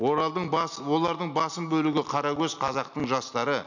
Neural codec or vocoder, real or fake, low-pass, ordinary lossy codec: none; real; 7.2 kHz; none